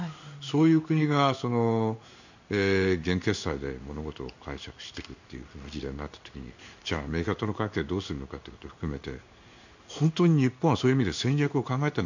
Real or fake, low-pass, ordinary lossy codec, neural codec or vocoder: fake; 7.2 kHz; none; codec, 16 kHz in and 24 kHz out, 1 kbps, XY-Tokenizer